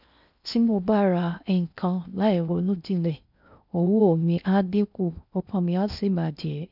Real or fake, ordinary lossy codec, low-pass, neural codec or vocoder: fake; none; 5.4 kHz; codec, 16 kHz in and 24 kHz out, 0.6 kbps, FocalCodec, streaming, 4096 codes